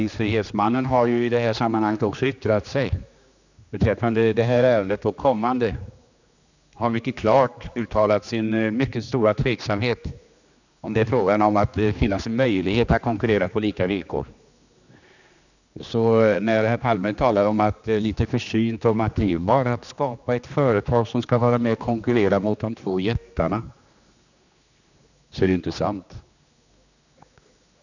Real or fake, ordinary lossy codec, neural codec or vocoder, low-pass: fake; none; codec, 16 kHz, 2 kbps, X-Codec, HuBERT features, trained on general audio; 7.2 kHz